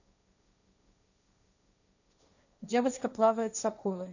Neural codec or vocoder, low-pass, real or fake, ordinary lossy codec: codec, 16 kHz, 1.1 kbps, Voila-Tokenizer; 7.2 kHz; fake; none